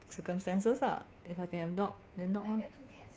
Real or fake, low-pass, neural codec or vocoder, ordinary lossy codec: fake; none; codec, 16 kHz, 2 kbps, FunCodec, trained on Chinese and English, 25 frames a second; none